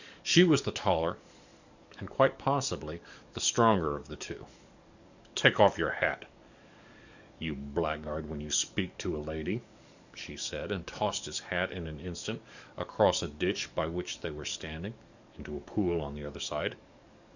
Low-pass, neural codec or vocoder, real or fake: 7.2 kHz; codec, 44.1 kHz, 7.8 kbps, DAC; fake